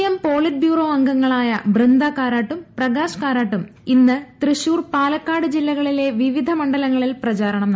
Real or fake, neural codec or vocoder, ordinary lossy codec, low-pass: real; none; none; none